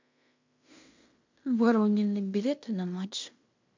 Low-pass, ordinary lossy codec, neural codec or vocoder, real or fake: 7.2 kHz; MP3, 64 kbps; codec, 16 kHz in and 24 kHz out, 0.9 kbps, LongCat-Audio-Codec, fine tuned four codebook decoder; fake